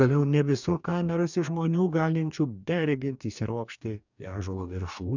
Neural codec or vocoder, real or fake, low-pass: codec, 44.1 kHz, 2.6 kbps, DAC; fake; 7.2 kHz